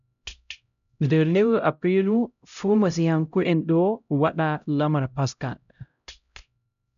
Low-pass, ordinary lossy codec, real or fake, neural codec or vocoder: 7.2 kHz; none; fake; codec, 16 kHz, 0.5 kbps, X-Codec, HuBERT features, trained on LibriSpeech